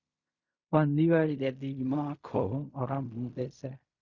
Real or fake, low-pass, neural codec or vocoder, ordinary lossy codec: fake; 7.2 kHz; codec, 16 kHz in and 24 kHz out, 0.4 kbps, LongCat-Audio-Codec, fine tuned four codebook decoder; Opus, 64 kbps